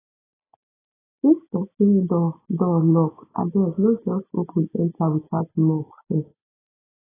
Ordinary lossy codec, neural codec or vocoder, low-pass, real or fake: AAC, 16 kbps; none; 3.6 kHz; real